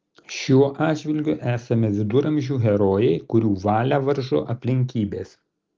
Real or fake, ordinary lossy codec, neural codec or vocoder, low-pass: real; Opus, 24 kbps; none; 7.2 kHz